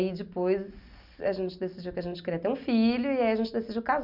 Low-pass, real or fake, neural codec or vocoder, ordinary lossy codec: 5.4 kHz; real; none; none